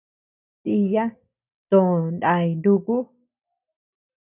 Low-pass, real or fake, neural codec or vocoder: 3.6 kHz; real; none